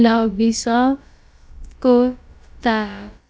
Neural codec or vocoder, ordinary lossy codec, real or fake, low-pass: codec, 16 kHz, about 1 kbps, DyCAST, with the encoder's durations; none; fake; none